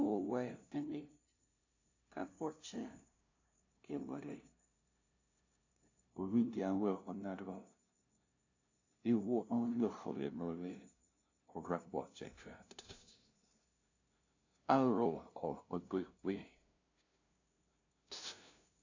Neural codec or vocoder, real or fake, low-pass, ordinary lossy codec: codec, 16 kHz, 0.5 kbps, FunCodec, trained on LibriTTS, 25 frames a second; fake; 7.2 kHz; AAC, 48 kbps